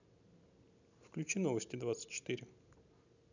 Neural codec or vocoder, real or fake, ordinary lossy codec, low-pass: none; real; none; 7.2 kHz